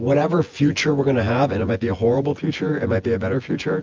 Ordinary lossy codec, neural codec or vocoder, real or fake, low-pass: Opus, 32 kbps; vocoder, 24 kHz, 100 mel bands, Vocos; fake; 7.2 kHz